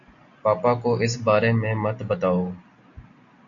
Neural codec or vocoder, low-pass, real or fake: none; 7.2 kHz; real